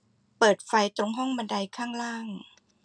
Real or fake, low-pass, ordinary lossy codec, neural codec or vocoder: real; 9.9 kHz; none; none